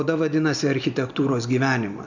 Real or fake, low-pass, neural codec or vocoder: real; 7.2 kHz; none